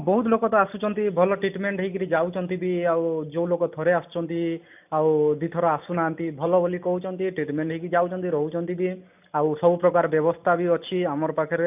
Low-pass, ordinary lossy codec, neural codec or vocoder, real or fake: 3.6 kHz; none; none; real